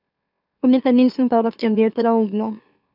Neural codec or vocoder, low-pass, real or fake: autoencoder, 44.1 kHz, a latent of 192 numbers a frame, MeloTTS; 5.4 kHz; fake